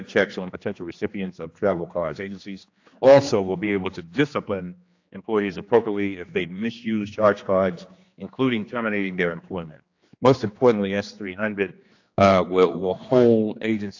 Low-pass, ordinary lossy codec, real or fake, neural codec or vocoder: 7.2 kHz; AAC, 48 kbps; fake; codec, 16 kHz, 2 kbps, X-Codec, HuBERT features, trained on general audio